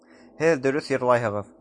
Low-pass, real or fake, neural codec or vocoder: 10.8 kHz; real; none